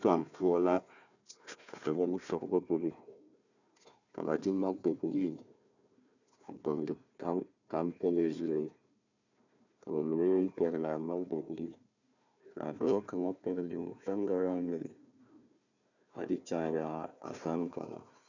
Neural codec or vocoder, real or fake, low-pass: codec, 16 kHz, 1 kbps, FunCodec, trained on Chinese and English, 50 frames a second; fake; 7.2 kHz